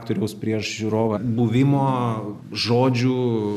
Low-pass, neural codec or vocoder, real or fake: 14.4 kHz; none; real